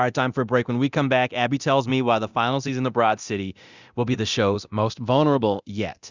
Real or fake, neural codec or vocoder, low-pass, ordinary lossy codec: fake; codec, 24 kHz, 0.9 kbps, DualCodec; 7.2 kHz; Opus, 64 kbps